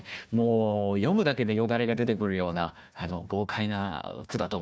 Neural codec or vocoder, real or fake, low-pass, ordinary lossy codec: codec, 16 kHz, 1 kbps, FunCodec, trained on Chinese and English, 50 frames a second; fake; none; none